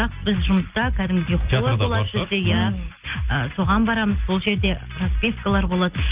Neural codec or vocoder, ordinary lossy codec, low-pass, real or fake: none; Opus, 24 kbps; 3.6 kHz; real